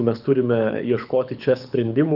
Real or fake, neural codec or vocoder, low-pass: fake; codec, 24 kHz, 6 kbps, HILCodec; 5.4 kHz